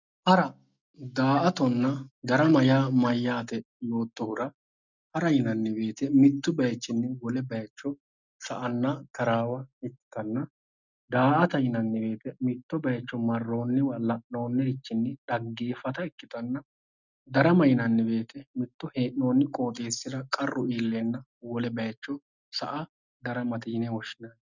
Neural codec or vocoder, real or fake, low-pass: none; real; 7.2 kHz